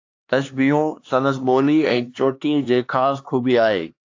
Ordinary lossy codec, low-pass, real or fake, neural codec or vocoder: AAC, 48 kbps; 7.2 kHz; fake; codec, 16 kHz, 2 kbps, X-Codec, HuBERT features, trained on LibriSpeech